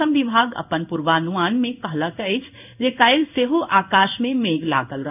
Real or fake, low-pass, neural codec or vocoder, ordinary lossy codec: fake; 3.6 kHz; codec, 16 kHz in and 24 kHz out, 1 kbps, XY-Tokenizer; none